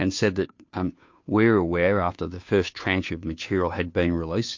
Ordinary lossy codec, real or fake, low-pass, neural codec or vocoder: MP3, 48 kbps; fake; 7.2 kHz; codec, 16 kHz, 2 kbps, FunCodec, trained on Chinese and English, 25 frames a second